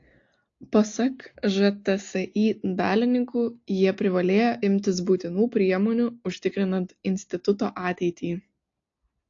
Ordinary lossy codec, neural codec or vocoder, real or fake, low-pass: AAC, 48 kbps; none; real; 7.2 kHz